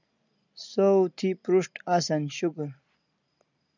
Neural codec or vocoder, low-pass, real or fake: none; 7.2 kHz; real